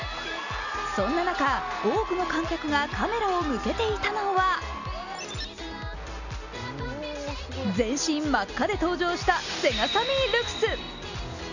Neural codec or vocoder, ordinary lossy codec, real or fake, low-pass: none; none; real; 7.2 kHz